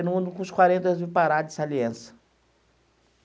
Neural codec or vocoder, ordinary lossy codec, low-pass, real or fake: none; none; none; real